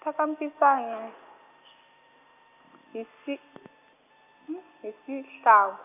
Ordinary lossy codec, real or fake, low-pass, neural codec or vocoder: none; real; 3.6 kHz; none